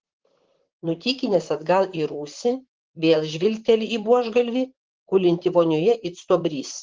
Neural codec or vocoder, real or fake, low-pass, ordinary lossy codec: vocoder, 44.1 kHz, 128 mel bands, Pupu-Vocoder; fake; 7.2 kHz; Opus, 16 kbps